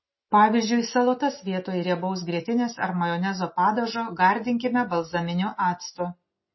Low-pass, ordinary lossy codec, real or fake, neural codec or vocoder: 7.2 kHz; MP3, 24 kbps; real; none